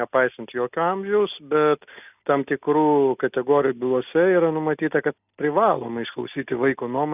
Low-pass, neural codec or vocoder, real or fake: 3.6 kHz; none; real